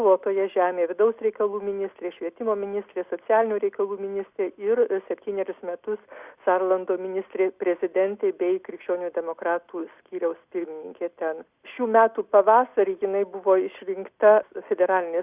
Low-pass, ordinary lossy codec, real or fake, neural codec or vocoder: 3.6 kHz; Opus, 64 kbps; real; none